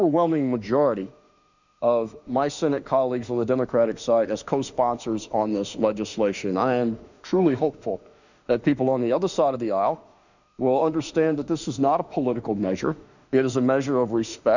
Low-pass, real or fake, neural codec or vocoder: 7.2 kHz; fake; autoencoder, 48 kHz, 32 numbers a frame, DAC-VAE, trained on Japanese speech